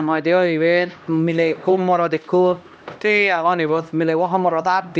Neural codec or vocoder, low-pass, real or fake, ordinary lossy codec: codec, 16 kHz, 1 kbps, X-Codec, HuBERT features, trained on LibriSpeech; none; fake; none